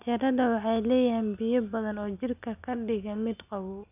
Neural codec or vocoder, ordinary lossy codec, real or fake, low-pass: none; none; real; 3.6 kHz